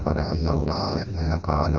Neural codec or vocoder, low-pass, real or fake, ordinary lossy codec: codec, 16 kHz, 1 kbps, FreqCodec, smaller model; 7.2 kHz; fake; none